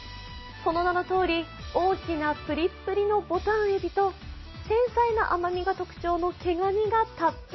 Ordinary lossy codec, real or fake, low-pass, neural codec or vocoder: MP3, 24 kbps; real; 7.2 kHz; none